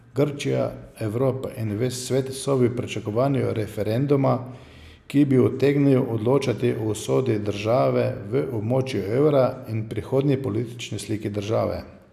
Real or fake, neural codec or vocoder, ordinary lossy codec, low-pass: real; none; none; 14.4 kHz